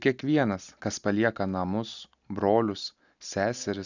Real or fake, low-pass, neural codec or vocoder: real; 7.2 kHz; none